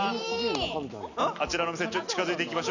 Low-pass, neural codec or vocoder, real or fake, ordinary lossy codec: 7.2 kHz; none; real; none